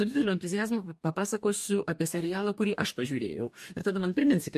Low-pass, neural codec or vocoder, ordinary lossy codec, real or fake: 14.4 kHz; codec, 44.1 kHz, 2.6 kbps, DAC; MP3, 64 kbps; fake